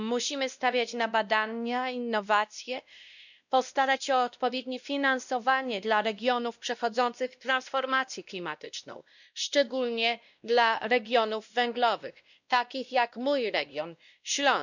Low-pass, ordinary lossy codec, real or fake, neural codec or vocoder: 7.2 kHz; none; fake; codec, 16 kHz, 1 kbps, X-Codec, WavLM features, trained on Multilingual LibriSpeech